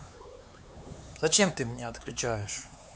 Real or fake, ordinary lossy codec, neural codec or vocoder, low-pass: fake; none; codec, 16 kHz, 4 kbps, X-Codec, HuBERT features, trained on LibriSpeech; none